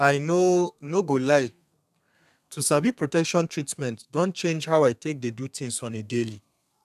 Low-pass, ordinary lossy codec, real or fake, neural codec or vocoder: 14.4 kHz; none; fake; codec, 32 kHz, 1.9 kbps, SNAC